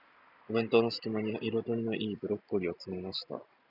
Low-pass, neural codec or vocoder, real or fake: 5.4 kHz; none; real